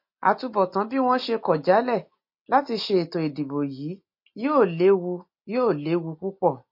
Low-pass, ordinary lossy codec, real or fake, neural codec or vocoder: 5.4 kHz; MP3, 32 kbps; real; none